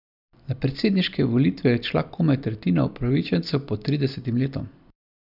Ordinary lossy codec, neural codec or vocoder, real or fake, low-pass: none; none; real; 5.4 kHz